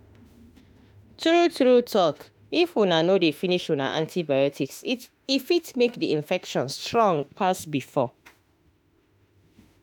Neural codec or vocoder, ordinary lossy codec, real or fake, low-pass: autoencoder, 48 kHz, 32 numbers a frame, DAC-VAE, trained on Japanese speech; none; fake; none